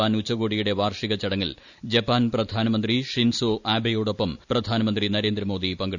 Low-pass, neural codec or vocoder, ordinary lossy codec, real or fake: 7.2 kHz; none; none; real